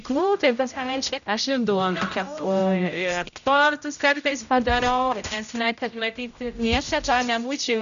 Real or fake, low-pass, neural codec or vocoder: fake; 7.2 kHz; codec, 16 kHz, 0.5 kbps, X-Codec, HuBERT features, trained on general audio